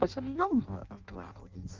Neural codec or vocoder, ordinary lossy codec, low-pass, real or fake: codec, 16 kHz in and 24 kHz out, 0.6 kbps, FireRedTTS-2 codec; Opus, 32 kbps; 7.2 kHz; fake